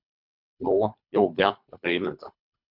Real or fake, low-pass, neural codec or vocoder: fake; 5.4 kHz; codec, 24 kHz, 3 kbps, HILCodec